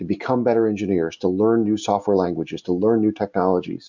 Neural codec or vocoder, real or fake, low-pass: none; real; 7.2 kHz